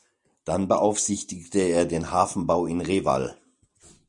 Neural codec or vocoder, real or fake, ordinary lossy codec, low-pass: none; real; MP3, 96 kbps; 10.8 kHz